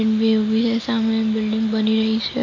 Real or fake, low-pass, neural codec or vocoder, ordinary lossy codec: real; 7.2 kHz; none; MP3, 48 kbps